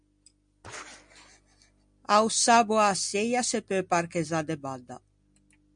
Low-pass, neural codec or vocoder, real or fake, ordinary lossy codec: 9.9 kHz; none; real; MP3, 64 kbps